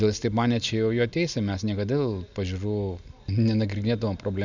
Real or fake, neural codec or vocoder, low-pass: real; none; 7.2 kHz